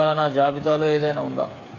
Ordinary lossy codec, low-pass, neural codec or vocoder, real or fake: AAC, 32 kbps; 7.2 kHz; codec, 16 kHz, 8 kbps, FreqCodec, smaller model; fake